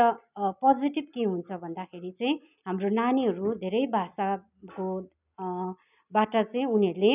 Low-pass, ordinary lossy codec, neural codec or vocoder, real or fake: 3.6 kHz; none; none; real